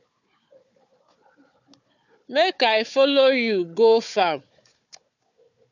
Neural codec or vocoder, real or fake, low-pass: codec, 16 kHz, 4 kbps, FunCodec, trained on Chinese and English, 50 frames a second; fake; 7.2 kHz